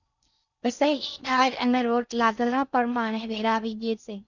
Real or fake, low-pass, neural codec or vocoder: fake; 7.2 kHz; codec, 16 kHz in and 24 kHz out, 0.6 kbps, FocalCodec, streaming, 4096 codes